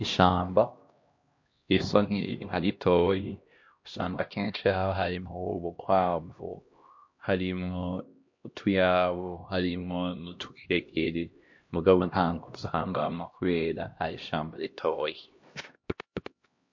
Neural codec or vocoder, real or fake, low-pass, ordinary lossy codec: codec, 16 kHz, 1 kbps, X-Codec, HuBERT features, trained on LibriSpeech; fake; 7.2 kHz; MP3, 48 kbps